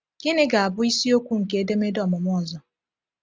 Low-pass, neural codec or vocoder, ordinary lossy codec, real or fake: none; none; none; real